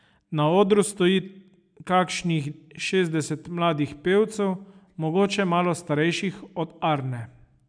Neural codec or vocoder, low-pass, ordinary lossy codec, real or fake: none; 9.9 kHz; none; real